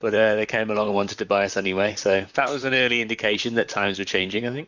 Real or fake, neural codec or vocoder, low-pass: fake; vocoder, 44.1 kHz, 128 mel bands, Pupu-Vocoder; 7.2 kHz